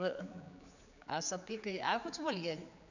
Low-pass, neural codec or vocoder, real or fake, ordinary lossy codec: 7.2 kHz; codec, 16 kHz, 4 kbps, X-Codec, HuBERT features, trained on general audio; fake; none